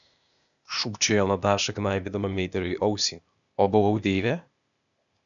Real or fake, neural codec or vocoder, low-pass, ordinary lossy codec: fake; codec, 16 kHz, 0.8 kbps, ZipCodec; 7.2 kHz; MP3, 96 kbps